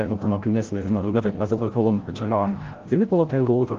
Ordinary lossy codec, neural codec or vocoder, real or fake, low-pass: Opus, 16 kbps; codec, 16 kHz, 0.5 kbps, FreqCodec, larger model; fake; 7.2 kHz